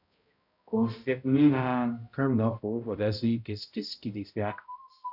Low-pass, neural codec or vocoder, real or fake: 5.4 kHz; codec, 16 kHz, 0.5 kbps, X-Codec, HuBERT features, trained on balanced general audio; fake